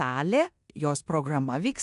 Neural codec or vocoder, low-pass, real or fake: codec, 16 kHz in and 24 kHz out, 0.9 kbps, LongCat-Audio-Codec, four codebook decoder; 10.8 kHz; fake